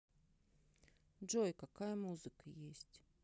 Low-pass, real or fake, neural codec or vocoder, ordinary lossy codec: none; real; none; none